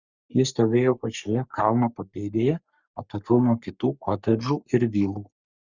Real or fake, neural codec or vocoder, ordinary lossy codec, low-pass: fake; codec, 44.1 kHz, 3.4 kbps, Pupu-Codec; Opus, 64 kbps; 7.2 kHz